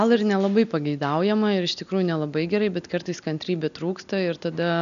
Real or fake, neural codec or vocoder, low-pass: real; none; 7.2 kHz